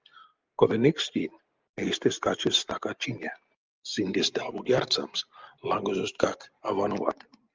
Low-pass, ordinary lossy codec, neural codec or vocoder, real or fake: 7.2 kHz; Opus, 32 kbps; none; real